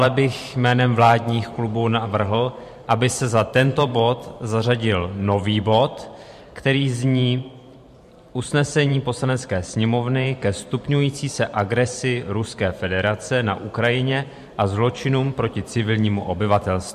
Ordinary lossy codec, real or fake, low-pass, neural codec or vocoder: MP3, 64 kbps; fake; 14.4 kHz; vocoder, 44.1 kHz, 128 mel bands every 512 samples, BigVGAN v2